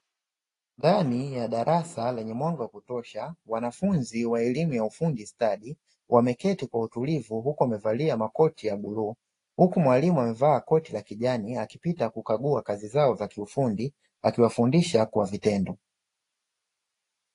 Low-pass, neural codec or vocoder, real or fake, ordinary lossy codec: 10.8 kHz; none; real; AAC, 48 kbps